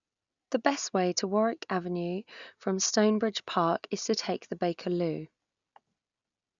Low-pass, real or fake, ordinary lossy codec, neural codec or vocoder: 7.2 kHz; real; none; none